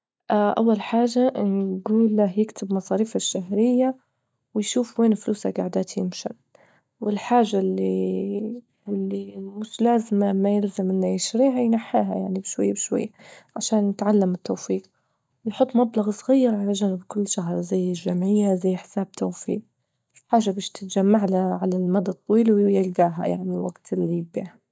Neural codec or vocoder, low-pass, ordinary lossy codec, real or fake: none; none; none; real